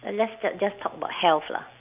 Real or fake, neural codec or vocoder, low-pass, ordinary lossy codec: real; none; 3.6 kHz; Opus, 24 kbps